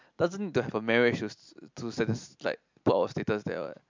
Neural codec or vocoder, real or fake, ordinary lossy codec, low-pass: none; real; MP3, 64 kbps; 7.2 kHz